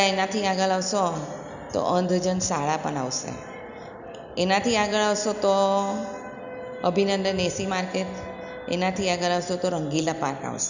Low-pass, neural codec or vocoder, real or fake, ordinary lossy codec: 7.2 kHz; none; real; none